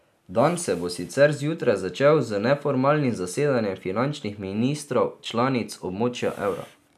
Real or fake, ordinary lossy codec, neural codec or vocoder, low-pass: real; none; none; 14.4 kHz